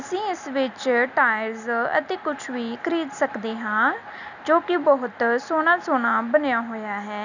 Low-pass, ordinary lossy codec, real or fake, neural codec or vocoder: 7.2 kHz; none; real; none